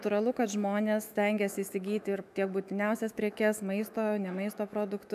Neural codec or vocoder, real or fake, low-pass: autoencoder, 48 kHz, 128 numbers a frame, DAC-VAE, trained on Japanese speech; fake; 14.4 kHz